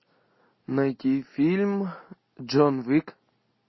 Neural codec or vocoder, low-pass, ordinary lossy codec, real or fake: none; 7.2 kHz; MP3, 24 kbps; real